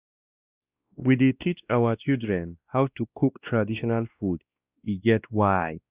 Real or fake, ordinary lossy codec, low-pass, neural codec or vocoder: fake; Opus, 64 kbps; 3.6 kHz; codec, 16 kHz, 1 kbps, X-Codec, WavLM features, trained on Multilingual LibriSpeech